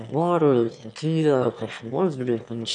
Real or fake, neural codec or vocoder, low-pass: fake; autoencoder, 22.05 kHz, a latent of 192 numbers a frame, VITS, trained on one speaker; 9.9 kHz